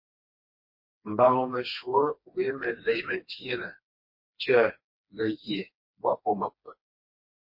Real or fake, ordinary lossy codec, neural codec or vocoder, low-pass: fake; MP3, 32 kbps; codec, 16 kHz, 2 kbps, FreqCodec, smaller model; 5.4 kHz